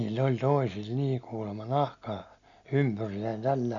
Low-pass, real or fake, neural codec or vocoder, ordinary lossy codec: 7.2 kHz; real; none; none